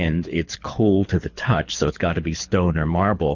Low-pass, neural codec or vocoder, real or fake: 7.2 kHz; codec, 24 kHz, 6 kbps, HILCodec; fake